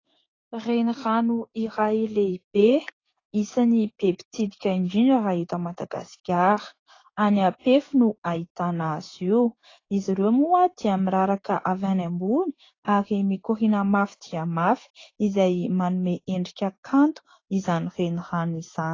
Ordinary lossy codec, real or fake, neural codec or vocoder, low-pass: AAC, 32 kbps; fake; codec, 16 kHz, 6 kbps, DAC; 7.2 kHz